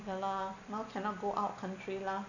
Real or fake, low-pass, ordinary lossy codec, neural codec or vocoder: real; 7.2 kHz; none; none